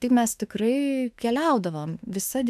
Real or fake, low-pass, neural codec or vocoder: fake; 14.4 kHz; autoencoder, 48 kHz, 32 numbers a frame, DAC-VAE, trained on Japanese speech